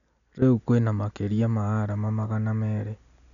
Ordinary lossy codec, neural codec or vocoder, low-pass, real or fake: none; none; 7.2 kHz; real